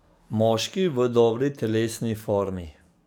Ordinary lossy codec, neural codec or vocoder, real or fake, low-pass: none; codec, 44.1 kHz, 7.8 kbps, DAC; fake; none